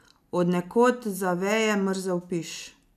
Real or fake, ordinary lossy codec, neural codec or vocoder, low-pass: real; none; none; 14.4 kHz